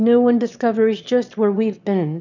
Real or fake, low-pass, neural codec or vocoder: fake; 7.2 kHz; autoencoder, 22.05 kHz, a latent of 192 numbers a frame, VITS, trained on one speaker